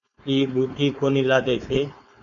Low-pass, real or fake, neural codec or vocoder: 7.2 kHz; fake; codec, 16 kHz, 4.8 kbps, FACodec